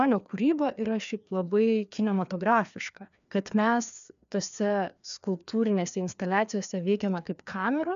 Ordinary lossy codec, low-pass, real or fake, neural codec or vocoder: MP3, 96 kbps; 7.2 kHz; fake; codec, 16 kHz, 2 kbps, FreqCodec, larger model